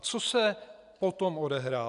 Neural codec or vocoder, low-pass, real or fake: none; 10.8 kHz; real